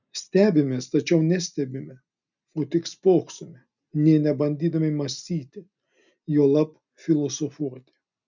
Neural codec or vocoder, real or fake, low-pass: none; real; 7.2 kHz